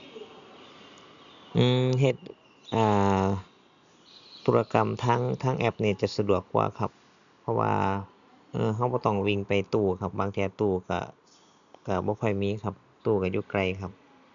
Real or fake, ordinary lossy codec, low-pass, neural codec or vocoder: real; none; 7.2 kHz; none